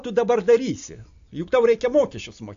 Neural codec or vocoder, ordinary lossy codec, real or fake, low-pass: none; AAC, 64 kbps; real; 7.2 kHz